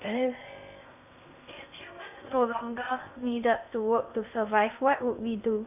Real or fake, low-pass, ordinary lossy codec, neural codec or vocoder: fake; 3.6 kHz; none; codec, 16 kHz in and 24 kHz out, 0.6 kbps, FocalCodec, streaming, 2048 codes